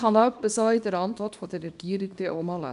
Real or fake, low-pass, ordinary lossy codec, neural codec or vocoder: fake; 10.8 kHz; none; codec, 24 kHz, 0.9 kbps, WavTokenizer, small release